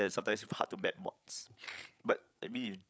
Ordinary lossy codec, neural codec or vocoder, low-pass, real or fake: none; codec, 16 kHz, 8 kbps, FreqCodec, larger model; none; fake